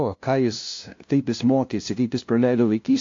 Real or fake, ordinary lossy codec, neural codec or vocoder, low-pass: fake; AAC, 48 kbps; codec, 16 kHz, 0.5 kbps, FunCodec, trained on LibriTTS, 25 frames a second; 7.2 kHz